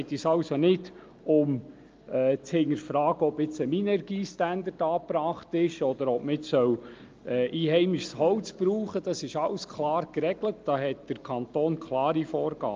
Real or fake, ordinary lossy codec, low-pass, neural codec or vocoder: real; Opus, 24 kbps; 7.2 kHz; none